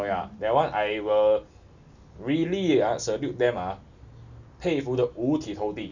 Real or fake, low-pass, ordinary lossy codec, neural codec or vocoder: real; 7.2 kHz; none; none